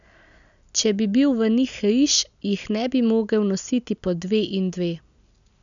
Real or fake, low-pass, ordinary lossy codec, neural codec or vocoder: real; 7.2 kHz; none; none